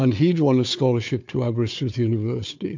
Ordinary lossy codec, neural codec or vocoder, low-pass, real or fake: MP3, 48 kbps; codec, 16 kHz, 4 kbps, FunCodec, trained on Chinese and English, 50 frames a second; 7.2 kHz; fake